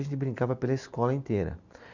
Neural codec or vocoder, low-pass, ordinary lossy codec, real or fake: none; 7.2 kHz; none; real